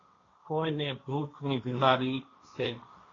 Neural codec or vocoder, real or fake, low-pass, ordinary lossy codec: codec, 16 kHz, 1.1 kbps, Voila-Tokenizer; fake; 7.2 kHz; AAC, 32 kbps